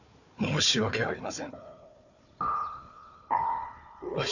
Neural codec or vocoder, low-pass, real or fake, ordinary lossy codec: codec, 16 kHz, 4 kbps, FunCodec, trained on Chinese and English, 50 frames a second; 7.2 kHz; fake; none